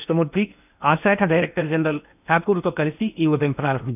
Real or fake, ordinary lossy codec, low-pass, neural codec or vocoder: fake; none; 3.6 kHz; codec, 16 kHz in and 24 kHz out, 0.8 kbps, FocalCodec, streaming, 65536 codes